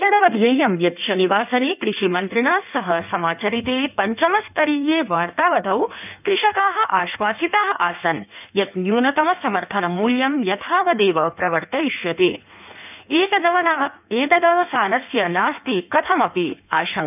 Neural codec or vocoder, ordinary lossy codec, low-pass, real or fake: codec, 16 kHz in and 24 kHz out, 1.1 kbps, FireRedTTS-2 codec; none; 3.6 kHz; fake